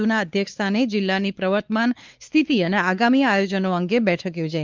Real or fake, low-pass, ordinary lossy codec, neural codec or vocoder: fake; 7.2 kHz; Opus, 32 kbps; codec, 16 kHz, 4 kbps, X-Codec, WavLM features, trained on Multilingual LibriSpeech